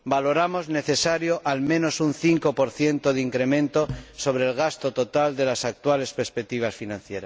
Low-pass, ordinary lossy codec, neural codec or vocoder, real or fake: none; none; none; real